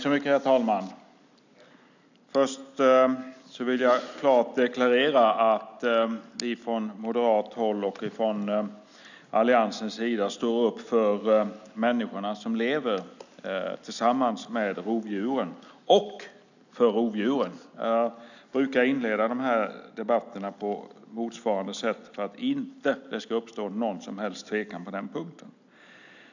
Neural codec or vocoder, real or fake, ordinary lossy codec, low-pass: none; real; none; 7.2 kHz